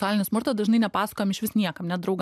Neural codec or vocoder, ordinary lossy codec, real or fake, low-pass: none; MP3, 96 kbps; real; 14.4 kHz